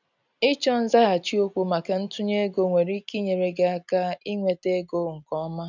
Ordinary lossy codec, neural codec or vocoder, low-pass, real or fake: none; none; 7.2 kHz; real